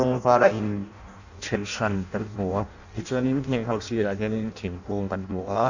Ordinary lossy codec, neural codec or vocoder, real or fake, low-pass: none; codec, 16 kHz in and 24 kHz out, 0.6 kbps, FireRedTTS-2 codec; fake; 7.2 kHz